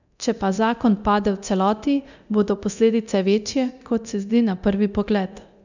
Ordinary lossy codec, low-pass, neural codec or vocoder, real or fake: none; 7.2 kHz; codec, 24 kHz, 0.9 kbps, DualCodec; fake